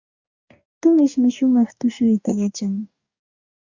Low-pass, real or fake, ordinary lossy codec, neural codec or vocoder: 7.2 kHz; fake; Opus, 64 kbps; codec, 44.1 kHz, 2.6 kbps, DAC